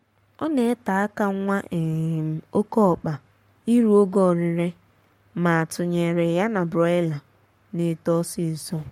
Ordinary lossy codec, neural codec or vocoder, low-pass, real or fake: MP3, 64 kbps; codec, 44.1 kHz, 7.8 kbps, Pupu-Codec; 19.8 kHz; fake